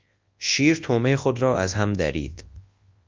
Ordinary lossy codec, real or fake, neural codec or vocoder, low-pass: Opus, 24 kbps; fake; codec, 24 kHz, 0.9 kbps, WavTokenizer, large speech release; 7.2 kHz